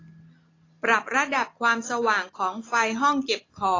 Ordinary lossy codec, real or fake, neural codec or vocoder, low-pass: AAC, 32 kbps; real; none; 7.2 kHz